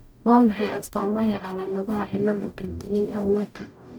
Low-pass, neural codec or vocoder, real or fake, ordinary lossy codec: none; codec, 44.1 kHz, 0.9 kbps, DAC; fake; none